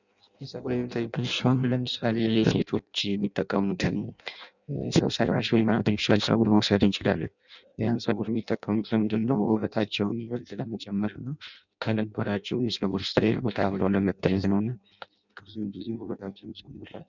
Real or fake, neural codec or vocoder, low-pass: fake; codec, 16 kHz in and 24 kHz out, 0.6 kbps, FireRedTTS-2 codec; 7.2 kHz